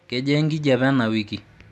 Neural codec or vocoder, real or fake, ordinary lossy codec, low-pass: none; real; none; none